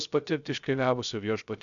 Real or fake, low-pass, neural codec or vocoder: fake; 7.2 kHz; codec, 16 kHz, 0.3 kbps, FocalCodec